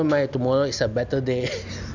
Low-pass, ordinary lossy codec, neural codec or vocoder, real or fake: 7.2 kHz; none; none; real